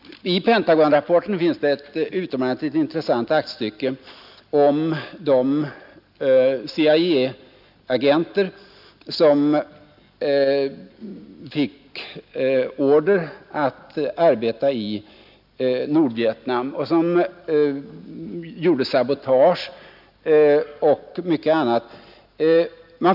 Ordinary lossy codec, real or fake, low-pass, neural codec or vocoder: none; real; 5.4 kHz; none